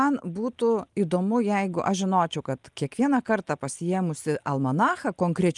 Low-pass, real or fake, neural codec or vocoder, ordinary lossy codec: 10.8 kHz; real; none; Opus, 32 kbps